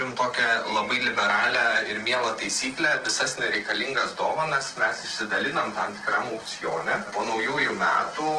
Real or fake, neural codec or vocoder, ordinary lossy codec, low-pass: real; none; Opus, 16 kbps; 9.9 kHz